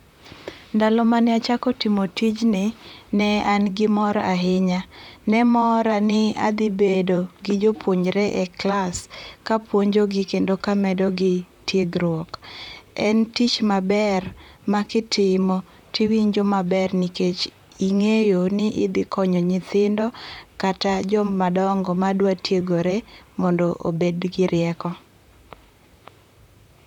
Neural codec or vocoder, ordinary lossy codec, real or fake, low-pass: vocoder, 44.1 kHz, 128 mel bands, Pupu-Vocoder; none; fake; 19.8 kHz